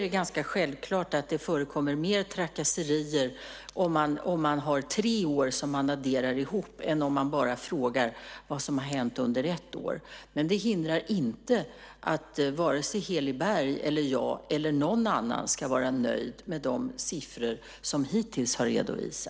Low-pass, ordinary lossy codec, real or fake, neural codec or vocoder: none; none; real; none